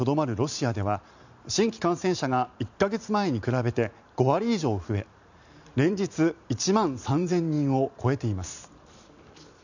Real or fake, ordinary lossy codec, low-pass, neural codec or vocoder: fake; none; 7.2 kHz; vocoder, 44.1 kHz, 128 mel bands every 512 samples, BigVGAN v2